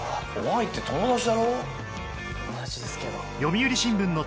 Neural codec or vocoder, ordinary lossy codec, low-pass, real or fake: none; none; none; real